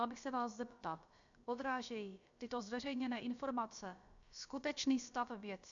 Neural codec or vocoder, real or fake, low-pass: codec, 16 kHz, about 1 kbps, DyCAST, with the encoder's durations; fake; 7.2 kHz